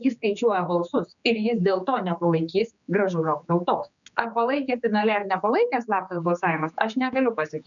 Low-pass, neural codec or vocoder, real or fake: 7.2 kHz; codec, 16 kHz, 4 kbps, X-Codec, HuBERT features, trained on general audio; fake